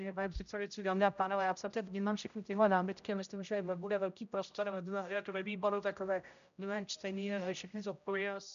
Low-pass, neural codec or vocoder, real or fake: 7.2 kHz; codec, 16 kHz, 0.5 kbps, X-Codec, HuBERT features, trained on general audio; fake